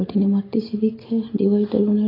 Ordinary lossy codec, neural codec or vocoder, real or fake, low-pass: AAC, 24 kbps; none; real; 5.4 kHz